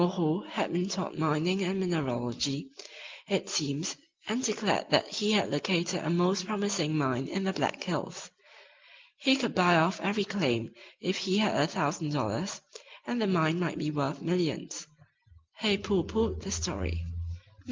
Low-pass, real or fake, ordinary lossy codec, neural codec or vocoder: 7.2 kHz; real; Opus, 32 kbps; none